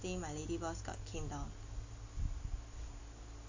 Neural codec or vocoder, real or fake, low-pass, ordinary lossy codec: none; real; 7.2 kHz; AAC, 48 kbps